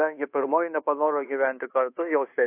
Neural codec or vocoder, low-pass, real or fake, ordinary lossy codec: codec, 24 kHz, 1.2 kbps, DualCodec; 3.6 kHz; fake; AAC, 24 kbps